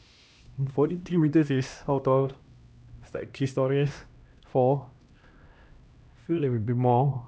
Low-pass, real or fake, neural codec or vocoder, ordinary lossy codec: none; fake; codec, 16 kHz, 1 kbps, X-Codec, HuBERT features, trained on LibriSpeech; none